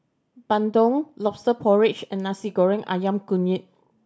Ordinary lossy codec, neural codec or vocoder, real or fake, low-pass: none; none; real; none